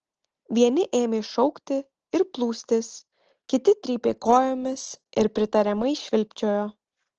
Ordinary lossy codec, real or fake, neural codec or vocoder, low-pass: Opus, 16 kbps; real; none; 7.2 kHz